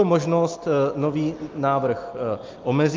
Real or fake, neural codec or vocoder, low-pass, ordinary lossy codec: real; none; 7.2 kHz; Opus, 32 kbps